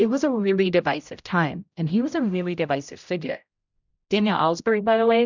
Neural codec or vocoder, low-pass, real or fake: codec, 16 kHz, 0.5 kbps, X-Codec, HuBERT features, trained on general audio; 7.2 kHz; fake